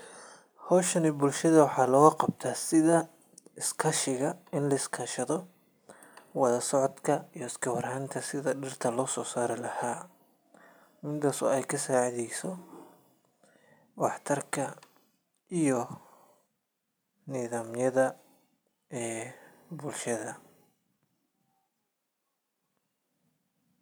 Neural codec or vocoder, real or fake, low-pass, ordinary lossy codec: none; real; none; none